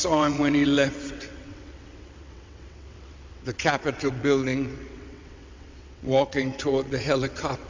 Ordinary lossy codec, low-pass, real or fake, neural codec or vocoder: MP3, 64 kbps; 7.2 kHz; fake; vocoder, 22.05 kHz, 80 mel bands, WaveNeXt